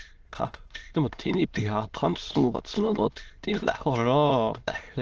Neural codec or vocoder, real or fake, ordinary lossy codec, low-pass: autoencoder, 22.05 kHz, a latent of 192 numbers a frame, VITS, trained on many speakers; fake; Opus, 16 kbps; 7.2 kHz